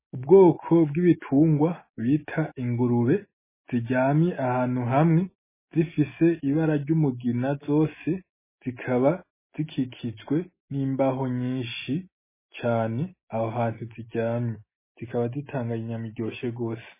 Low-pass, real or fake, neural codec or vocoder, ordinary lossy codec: 3.6 kHz; real; none; MP3, 16 kbps